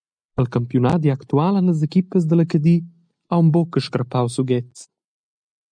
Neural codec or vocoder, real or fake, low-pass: none; real; 9.9 kHz